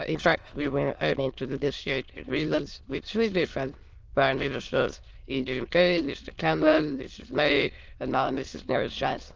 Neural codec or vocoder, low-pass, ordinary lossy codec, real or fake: autoencoder, 22.05 kHz, a latent of 192 numbers a frame, VITS, trained on many speakers; 7.2 kHz; Opus, 24 kbps; fake